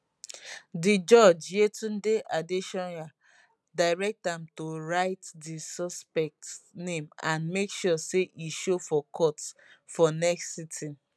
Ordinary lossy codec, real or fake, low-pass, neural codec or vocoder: none; real; none; none